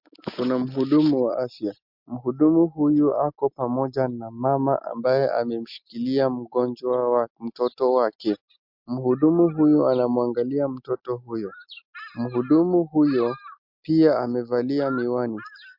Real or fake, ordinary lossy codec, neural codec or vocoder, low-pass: real; MP3, 48 kbps; none; 5.4 kHz